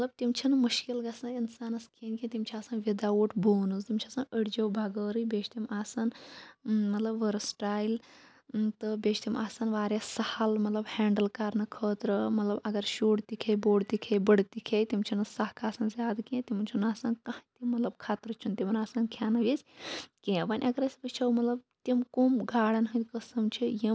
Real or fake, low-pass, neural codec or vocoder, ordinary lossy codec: real; none; none; none